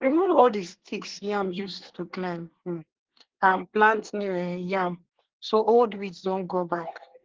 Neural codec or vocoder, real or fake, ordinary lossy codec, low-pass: codec, 24 kHz, 1 kbps, SNAC; fake; Opus, 16 kbps; 7.2 kHz